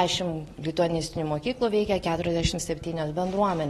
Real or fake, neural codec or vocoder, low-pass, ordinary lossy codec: real; none; 19.8 kHz; AAC, 32 kbps